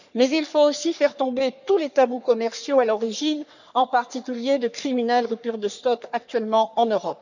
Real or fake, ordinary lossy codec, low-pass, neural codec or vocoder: fake; none; 7.2 kHz; codec, 44.1 kHz, 3.4 kbps, Pupu-Codec